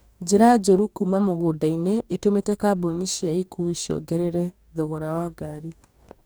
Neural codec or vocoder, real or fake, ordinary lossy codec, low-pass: codec, 44.1 kHz, 2.6 kbps, DAC; fake; none; none